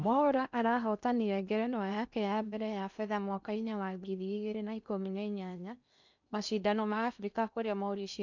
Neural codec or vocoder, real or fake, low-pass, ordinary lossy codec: codec, 16 kHz in and 24 kHz out, 0.8 kbps, FocalCodec, streaming, 65536 codes; fake; 7.2 kHz; none